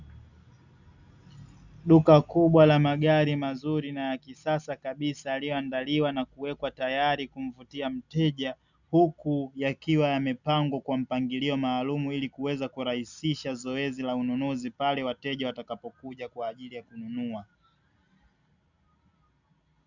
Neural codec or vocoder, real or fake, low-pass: none; real; 7.2 kHz